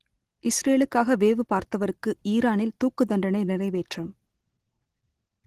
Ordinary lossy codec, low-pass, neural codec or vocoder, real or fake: Opus, 16 kbps; 14.4 kHz; none; real